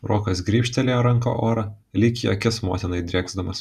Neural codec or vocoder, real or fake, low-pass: none; real; 14.4 kHz